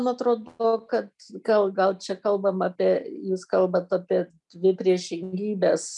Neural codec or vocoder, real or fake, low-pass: none; real; 10.8 kHz